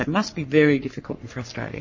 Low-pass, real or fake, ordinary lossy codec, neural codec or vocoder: 7.2 kHz; fake; MP3, 32 kbps; codec, 44.1 kHz, 3.4 kbps, Pupu-Codec